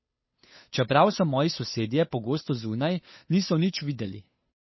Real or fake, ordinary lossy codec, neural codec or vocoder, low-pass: fake; MP3, 24 kbps; codec, 16 kHz, 8 kbps, FunCodec, trained on Chinese and English, 25 frames a second; 7.2 kHz